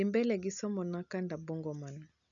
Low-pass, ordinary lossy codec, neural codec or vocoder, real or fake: 7.2 kHz; none; none; real